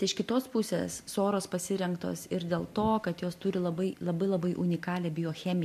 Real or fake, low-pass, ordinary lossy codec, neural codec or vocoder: real; 14.4 kHz; MP3, 64 kbps; none